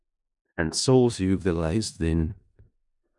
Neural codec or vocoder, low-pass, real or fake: codec, 16 kHz in and 24 kHz out, 0.4 kbps, LongCat-Audio-Codec, four codebook decoder; 10.8 kHz; fake